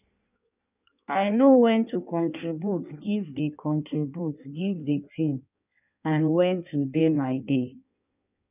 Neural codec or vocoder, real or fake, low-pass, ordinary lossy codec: codec, 16 kHz in and 24 kHz out, 1.1 kbps, FireRedTTS-2 codec; fake; 3.6 kHz; none